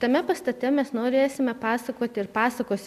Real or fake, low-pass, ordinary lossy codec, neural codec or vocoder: real; 14.4 kHz; MP3, 96 kbps; none